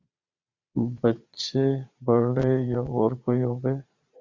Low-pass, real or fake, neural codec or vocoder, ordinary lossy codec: 7.2 kHz; fake; vocoder, 22.05 kHz, 80 mel bands, Vocos; Opus, 64 kbps